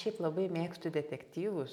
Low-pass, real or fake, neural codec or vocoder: 19.8 kHz; real; none